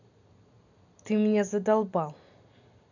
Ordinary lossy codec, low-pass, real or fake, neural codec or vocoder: none; 7.2 kHz; real; none